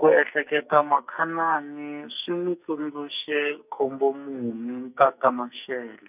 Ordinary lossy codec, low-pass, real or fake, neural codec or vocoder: none; 3.6 kHz; fake; codec, 44.1 kHz, 2.6 kbps, SNAC